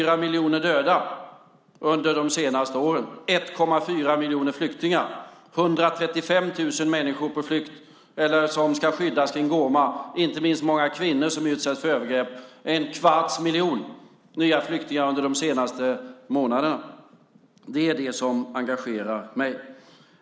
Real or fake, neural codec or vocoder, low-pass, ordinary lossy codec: real; none; none; none